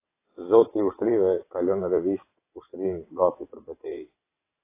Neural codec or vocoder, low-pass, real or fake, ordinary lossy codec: vocoder, 22.05 kHz, 80 mel bands, WaveNeXt; 3.6 kHz; fake; AAC, 24 kbps